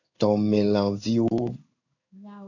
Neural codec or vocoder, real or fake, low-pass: codec, 16 kHz in and 24 kHz out, 1 kbps, XY-Tokenizer; fake; 7.2 kHz